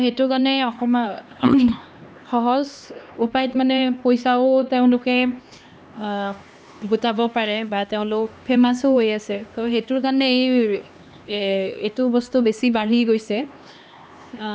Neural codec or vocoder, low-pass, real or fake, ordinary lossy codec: codec, 16 kHz, 2 kbps, X-Codec, HuBERT features, trained on LibriSpeech; none; fake; none